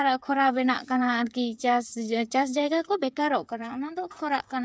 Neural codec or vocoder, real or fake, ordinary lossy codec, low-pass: codec, 16 kHz, 8 kbps, FreqCodec, smaller model; fake; none; none